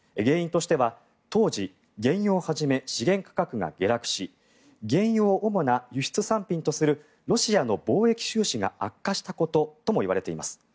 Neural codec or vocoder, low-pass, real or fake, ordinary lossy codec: none; none; real; none